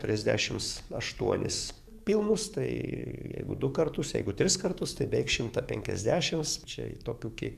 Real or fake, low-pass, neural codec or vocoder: fake; 14.4 kHz; codec, 44.1 kHz, 7.8 kbps, DAC